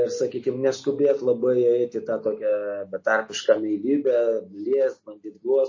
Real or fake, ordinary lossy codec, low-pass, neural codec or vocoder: real; MP3, 32 kbps; 7.2 kHz; none